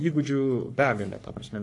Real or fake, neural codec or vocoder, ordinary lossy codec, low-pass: fake; codec, 44.1 kHz, 3.4 kbps, Pupu-Codec; AAC, 48 kbps; 10.8 kHz